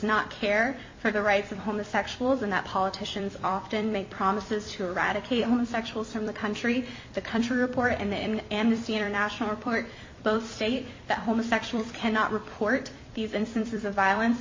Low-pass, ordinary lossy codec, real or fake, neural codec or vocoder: 7.2 kHz; MP3, 32 kbps; fake; vocoder, 44.1 kHz, 128 mel bands every 256 samples, BigVGAN v2